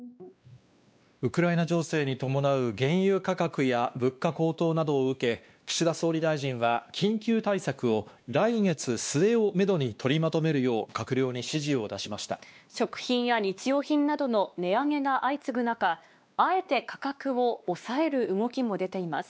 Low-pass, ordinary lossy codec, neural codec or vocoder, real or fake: none; none; codec, 16 kHz, 2 kbps, X-Codec, WavLM features, trained on Multilingual LibriSpeech; fake